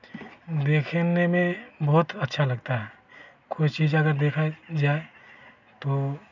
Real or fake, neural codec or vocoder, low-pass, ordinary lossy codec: real; none; 7.2 kHz; none